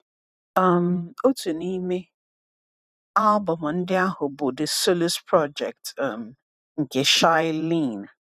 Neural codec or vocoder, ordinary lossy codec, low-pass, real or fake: vocoder, 44.1 kHz, 128 mel bands every 512 samples, BigVGAN v2; none; 14.4 kHz; fake